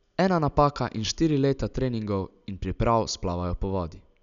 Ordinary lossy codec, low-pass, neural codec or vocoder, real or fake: none; 7.2 kHz; none; real